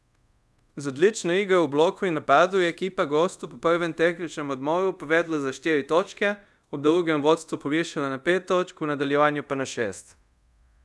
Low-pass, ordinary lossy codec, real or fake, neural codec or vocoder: none; none; fake; codec, 24 kHz, 0.5 kbps, DualCodec